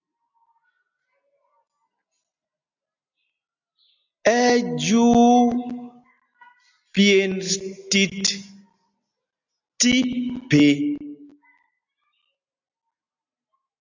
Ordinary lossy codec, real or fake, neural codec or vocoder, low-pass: AAC, 48 kbps; real; none; 7.2 kHz